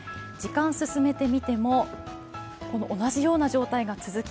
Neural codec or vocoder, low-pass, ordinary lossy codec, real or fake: none; none; none; real